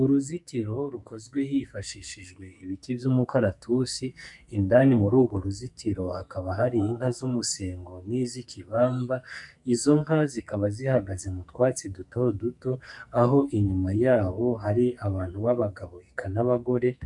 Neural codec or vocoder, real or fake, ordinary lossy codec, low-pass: codec, 44.1 kHz, 2.6 kbps, SNAC; fake; MP3, 96 kbps; 10.8 kHz